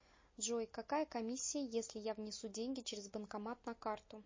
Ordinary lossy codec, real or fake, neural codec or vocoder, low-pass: MP3, 32 kbps; real; none; 7.2 kHz